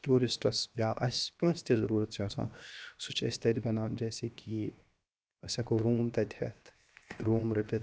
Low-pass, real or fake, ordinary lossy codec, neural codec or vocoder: none; fake; none; codec, 16 kHz, 0.7 kbps, FocalCodec